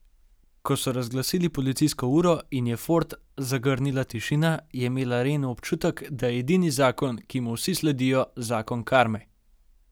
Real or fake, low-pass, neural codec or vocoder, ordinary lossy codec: real; none; none; none